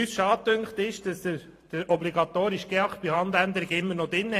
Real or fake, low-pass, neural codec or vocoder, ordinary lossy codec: fake; 14.4 kHz; vocoder, 44.1 kHz, 128 mel bands every 512 samples, BigVGAN v2; AAC, 48 kbps